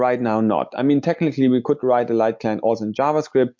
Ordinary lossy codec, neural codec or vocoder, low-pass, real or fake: MP3, 48 kbps; none; 7.2 kHz; real